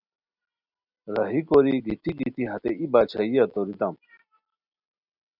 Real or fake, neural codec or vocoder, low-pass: real; none; 5.4 kHz